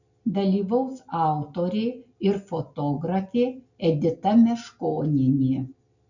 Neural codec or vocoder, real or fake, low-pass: none; real; 7.2 kHz